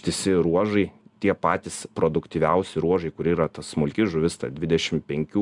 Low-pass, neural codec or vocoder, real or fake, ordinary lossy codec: 10.8 kHz; none; real; Opus, 64 kbps